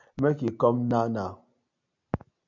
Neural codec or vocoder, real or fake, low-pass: none; real; 7.2 kHz